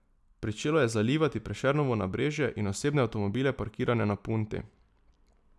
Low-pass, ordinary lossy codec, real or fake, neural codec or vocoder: none; none; real; none